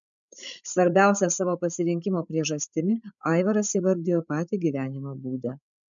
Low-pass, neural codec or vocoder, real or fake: 7.2 kHz; codec, 16 kHz, 16 kbps, FreqCodec, larger model; fake